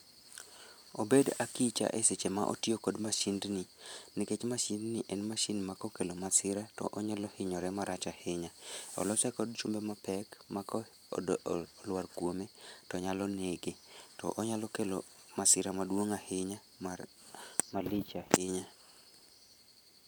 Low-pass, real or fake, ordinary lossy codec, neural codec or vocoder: none; fake; none; vocoder, 44.1 kHz, 128 mel bands every 512 samples, BigVGAN v2